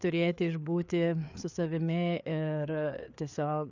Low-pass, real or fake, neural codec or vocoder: 7.2 kHz; fake; codec, 16 kHz, 4 kbps, FreqCodec, larger model